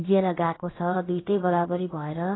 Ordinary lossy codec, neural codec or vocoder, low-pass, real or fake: AAC, 16 kbps; codec, 16 kHz, about 1 kbps, DyCAST, with the encoder's durations; 7.2 kHz; fake